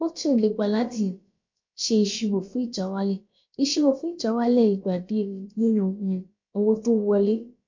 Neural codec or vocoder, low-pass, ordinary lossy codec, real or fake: codec, 16 kHz, about 1 kbps, DyCAST, with the encoder's durations; 7.2 kHz; MP3, 48 kbps; fake